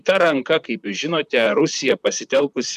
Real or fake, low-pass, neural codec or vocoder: fake; 14.4 kHz; vocoder, 44.1 kHz, 128 mel bands, Pupu-Vocoder